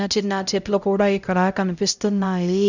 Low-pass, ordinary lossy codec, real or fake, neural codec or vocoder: 7.2 kHz; none; fake; codec, 16 kHz, 0.5 kbps, X-Codec, HuBERT features, trained on LibriSpeech